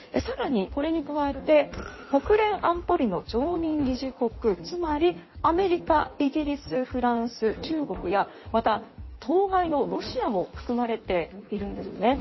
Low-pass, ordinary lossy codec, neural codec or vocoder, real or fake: 7.2 kHz; MP3, 24 kbps; codec, 16 kHz in and 24 kHz out, 1.1 kbps, FireRedTTS-2 codec; fake